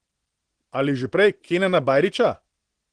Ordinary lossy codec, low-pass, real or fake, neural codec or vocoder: Opus, 16 kbps; 9.9 kHz; real; none